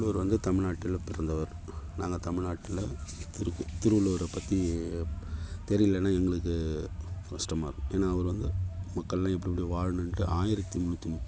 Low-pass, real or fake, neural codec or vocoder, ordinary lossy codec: none; real; none; none